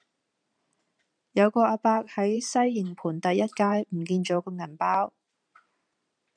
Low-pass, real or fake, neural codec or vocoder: 9.9 kHz; fake; vocoder, 44.1 kHz, 128 mel bands every 512 samples, BigVGAN v2